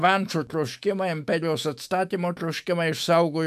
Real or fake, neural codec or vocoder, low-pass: fake; autoencoder, 48 kHz, 128 numbers a frame, DAC-VAE, trained on Japanese speech; 14.4 kHz